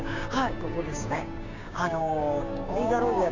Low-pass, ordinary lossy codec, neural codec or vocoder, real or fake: 7.2 kHz; none; codec, 16 kHz, 6 kbps, DAC; fake